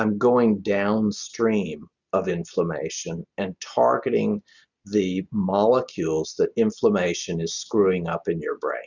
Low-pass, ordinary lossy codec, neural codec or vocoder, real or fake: 7.2 kHz; Opus, 64 kbps; none; real